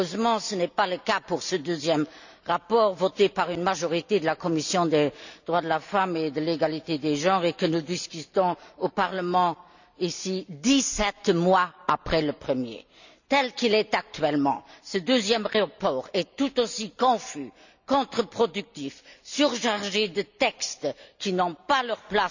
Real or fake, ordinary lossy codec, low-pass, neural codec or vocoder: real; none; 7.2 kHz; none